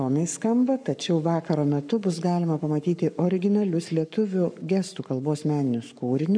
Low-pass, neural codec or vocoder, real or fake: 9.9 kHz; codec, 44.1 kHz, 7.8 kbps, Pupu-Codec; fake